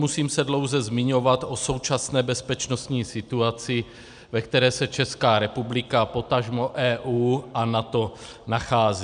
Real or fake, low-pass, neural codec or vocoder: real; 9.9 kHz; none